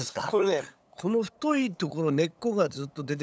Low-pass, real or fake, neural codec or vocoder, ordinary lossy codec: none; fake; codec, 16 kHz, 8 kbps, FunCodec, trained on LibriTTS, 25 frames a second; none